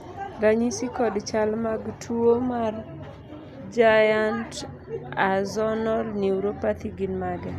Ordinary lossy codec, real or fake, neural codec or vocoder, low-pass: none; real; none; 14.4 kHz